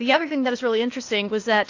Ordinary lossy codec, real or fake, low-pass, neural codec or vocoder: AAC, 48 kbps; fake; 7.2 kHz; codec, 16 kHz, 0.8 kbps, ZipCodec